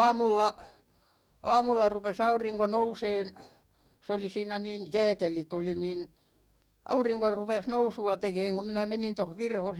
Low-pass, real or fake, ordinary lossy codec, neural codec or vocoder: 19.8 kHz; fake; none; codec, 44.1 kHz, 2.6 kbps, DAC